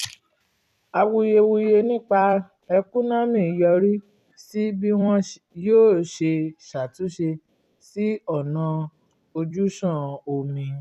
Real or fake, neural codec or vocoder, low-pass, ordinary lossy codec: fake; vocoder, 44.1 kHz, 128 mel bands every 256 samples, BigVGAN v2; 14.4 kHz; none